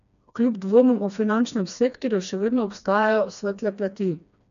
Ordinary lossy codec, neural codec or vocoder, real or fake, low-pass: none; codec, 16 kHz, 2 kbps, FreqCodec, smaller model; fake; 7.2 kHz